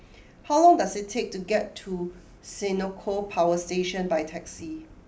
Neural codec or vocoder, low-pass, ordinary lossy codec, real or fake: none; none; none; real